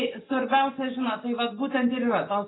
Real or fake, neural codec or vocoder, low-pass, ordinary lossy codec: real; none; 7.2 kHz; AAC, 16 kbps